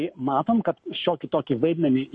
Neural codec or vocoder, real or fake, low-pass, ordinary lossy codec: codec, 44.1 kHz, 7.8 kbps, Pupu-Codec; fake; 9.9 kHz; MP3, 48 kbps